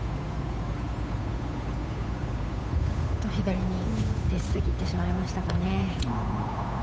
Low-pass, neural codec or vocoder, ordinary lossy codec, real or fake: none; codec, 16 kHz, 8 kbps, FunCodec, trained on Chinese and English, 25 frames a second; none; fake